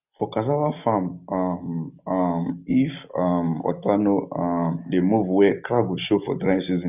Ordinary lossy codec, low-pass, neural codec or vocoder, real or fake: none; 3.6 kHz; none; real